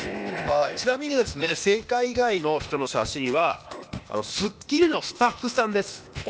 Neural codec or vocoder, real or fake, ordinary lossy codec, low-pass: codec, 16 kHz, 0.8 kbps, ZipCodec; fake; none; none